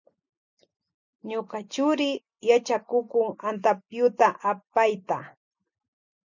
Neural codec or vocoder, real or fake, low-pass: none; real; 7.2 kHz